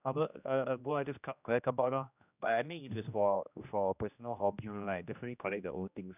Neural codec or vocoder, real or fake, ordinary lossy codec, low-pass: codec, 16 kHz, 1 kbps, X-Codec, HuBERT features, trained on general audio; fake; none; 3.6 kHz